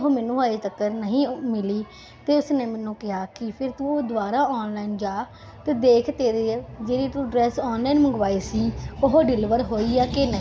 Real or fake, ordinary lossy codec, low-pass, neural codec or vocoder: real; none; none; none